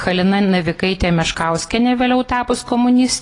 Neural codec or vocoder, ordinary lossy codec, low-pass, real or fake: none; AAC, 32 kbps; 10.8 kHz; real